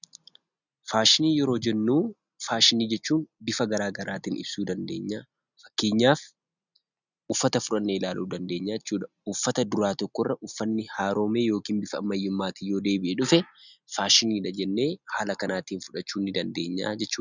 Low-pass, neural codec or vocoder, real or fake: 7.2 kHz; none; real